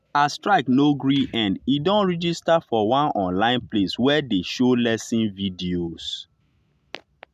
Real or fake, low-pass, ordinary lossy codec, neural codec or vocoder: real; 14.4 kHz; MP3, 96 kbps; none